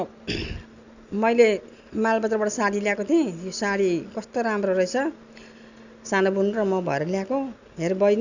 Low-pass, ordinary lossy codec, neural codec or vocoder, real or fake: 7.2 kHz; none; none; real